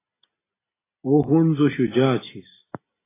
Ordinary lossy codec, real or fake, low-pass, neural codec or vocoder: AAC, 16 kbps; real; 3.6 kHz; none